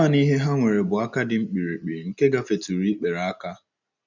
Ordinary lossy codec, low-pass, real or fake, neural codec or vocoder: none; 7.2 kHz; real; none